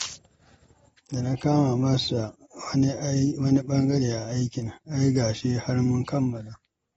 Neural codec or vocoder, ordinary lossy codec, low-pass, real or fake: none; AAC, 24 kbps; 19.8 kHz; real